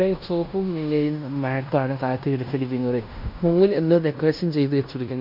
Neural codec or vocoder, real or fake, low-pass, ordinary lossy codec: codec, 16 kHz in and 24 kHz out, 0.9 kbps, LongCat-Audio-Codec, fine tuned four codebook decoder; fake; 5.4 kHz; MP3, 48 kbps